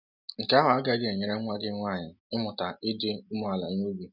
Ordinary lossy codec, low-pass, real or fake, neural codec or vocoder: none; 5.4 kHz; real; none